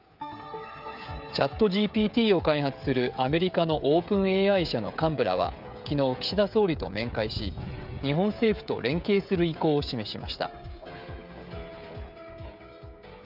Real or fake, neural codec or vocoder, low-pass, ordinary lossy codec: fake; codec, 16 kHz, 16 kbps, FreqCodec, smaller model; 5.4 kHz; none